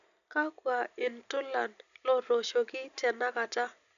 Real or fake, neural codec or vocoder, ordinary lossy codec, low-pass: real; none; none; 7.2 kHz